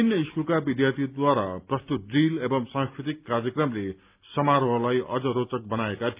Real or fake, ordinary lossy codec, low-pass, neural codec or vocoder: real; Opus, 24 kbps; 3.6 kHz; none